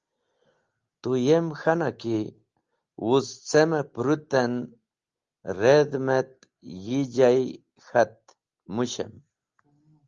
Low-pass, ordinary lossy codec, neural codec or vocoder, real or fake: 7.2 kHz; Opus, 32 kbps; none; real